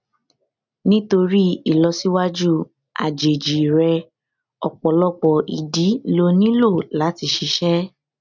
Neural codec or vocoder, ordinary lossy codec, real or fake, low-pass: none; none; real; 7.2 kHz